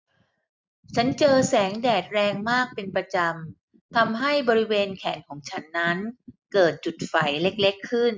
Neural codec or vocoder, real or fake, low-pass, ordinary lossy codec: none; real; none; none